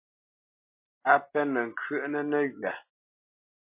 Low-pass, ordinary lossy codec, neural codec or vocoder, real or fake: 3.6 kHz; MP3, 24 kbps; none; real